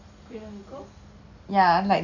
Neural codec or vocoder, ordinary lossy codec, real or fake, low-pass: none; none; real; 7.2 kHz